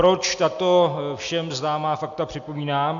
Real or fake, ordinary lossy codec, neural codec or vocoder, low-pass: real; AAC, 64 kbps; none; 7.2 kHz